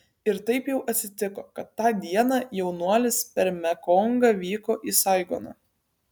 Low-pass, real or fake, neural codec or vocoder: 19.8 kHz; real; none